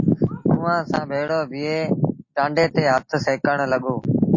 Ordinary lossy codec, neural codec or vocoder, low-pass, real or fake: MP3, 32 kbps; none; 7.2 kHz; real